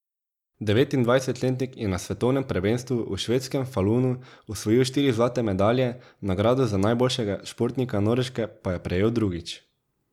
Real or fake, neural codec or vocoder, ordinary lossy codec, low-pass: real; none; none; 19.8 kHz